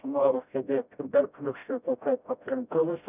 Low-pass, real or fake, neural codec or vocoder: 3.6 kHz; fake; codec, 16 kHz, 0.5 kbps, FreqCodec, smaller model